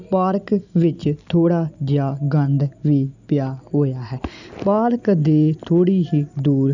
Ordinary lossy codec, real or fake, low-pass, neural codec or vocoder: none; real; 7.2 kHz; none